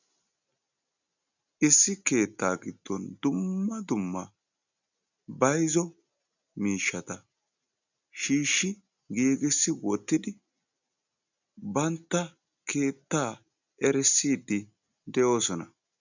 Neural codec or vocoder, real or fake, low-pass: none; real; 7.2 kHz